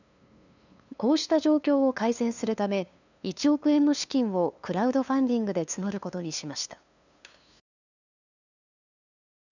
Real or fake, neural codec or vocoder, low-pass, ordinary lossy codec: fake; codec, 16 kHz, 2 kbps, FunCodec, trained on LibriTTS, 25 frames a second; 7.2 kHz; none